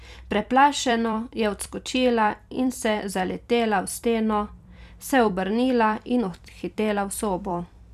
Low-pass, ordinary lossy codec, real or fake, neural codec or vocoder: 14.4 kHz; none; fake; vocoder, 44.1 kHz, 128 mel bands every 256 samples, BigVGAN v2